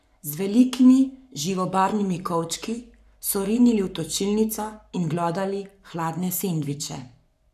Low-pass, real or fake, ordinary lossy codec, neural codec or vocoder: 14.4 kHz; fake; none; codec, 44.1 kHz, 7.8 kbps, Pupu-Codec